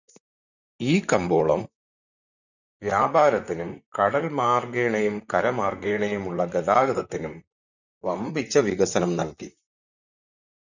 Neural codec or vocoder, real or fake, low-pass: vocoder, 44.1 kHz, 128 mel bands, Pupu-Vocoder; fake; 7.2 kHz